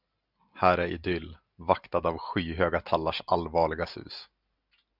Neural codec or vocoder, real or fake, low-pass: none; real; 5.4 kHz